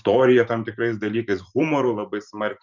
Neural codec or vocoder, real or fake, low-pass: vocoder, 44.1 kHz, 128 mel bands every 512 samples, BigVGAN v2; fake; 7.2 kHz